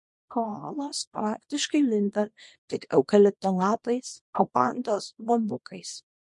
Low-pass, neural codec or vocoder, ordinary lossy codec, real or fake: 10.8 kHz; codec, 24 kHz, 0.9 kbps, WavTokenizer, small release; MP3, 48 kbps; fake